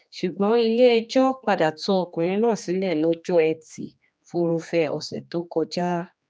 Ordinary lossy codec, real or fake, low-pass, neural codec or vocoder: none; fake; none; codec, 16 kHz, 2 kbps, X-Codec, HuBERT features, trained on general audio